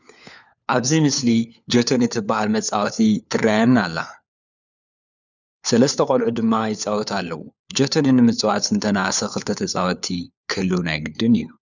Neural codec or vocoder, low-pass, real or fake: codec, 16 kHz, 4 kbps, FunCodec, trained on LibriTTS, 50 frames a second; 7.2 kHz; fake